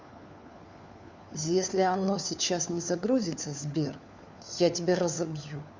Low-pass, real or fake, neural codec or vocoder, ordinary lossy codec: 7.2 kHz; fake; codec, 16 kHz, 4 kbps, FunCodec, trained on LibriTTS, 50 frames a second; Opus, 64 kbps